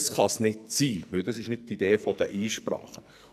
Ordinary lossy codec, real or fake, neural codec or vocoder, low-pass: none; fake; codec, 44.1 kHz, 2.6 kbps, SNAC; 14.4 kHz